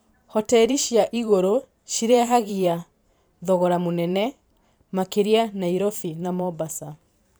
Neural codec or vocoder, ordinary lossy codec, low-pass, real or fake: vocoder, 44.1 kHz, 128 mel bands every 512 samples, BigVGAN v2; none; none; fake